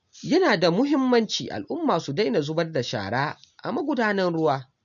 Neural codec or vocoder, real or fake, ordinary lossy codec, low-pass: none; real; none; 7.2 kHz